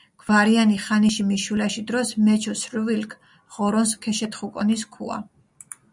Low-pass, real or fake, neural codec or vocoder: 10.8 kHz; real; none